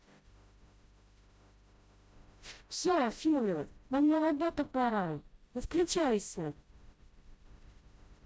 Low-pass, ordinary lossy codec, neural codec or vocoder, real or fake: none; none; codec, 16 kHz, 0.5 kbps, FreqCodec, smaller model; fake